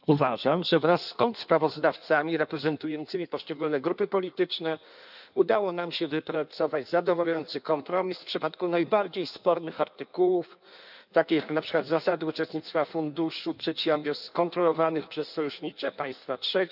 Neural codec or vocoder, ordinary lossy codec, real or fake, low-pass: codec, 16 kHz in and 24 kHz out, 1.1 kbps, FireRedTTS-2 codec; none; fake; 5.4 kHz